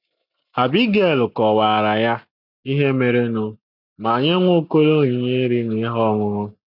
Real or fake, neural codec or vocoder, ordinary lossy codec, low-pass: real; none; none; 5.4 kHz